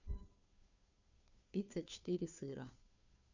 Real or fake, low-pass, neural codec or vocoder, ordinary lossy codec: fake; 7.2 kHz; codec, 16 kHz, 2 kbps, FunCodec, trained on Chinese and English, 25 frames a second; none